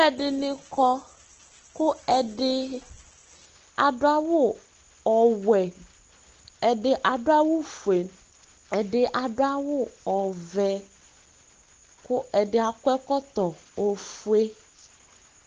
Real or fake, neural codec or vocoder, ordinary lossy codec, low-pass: real; none; Opus, 16 kbps; 7.2 kHz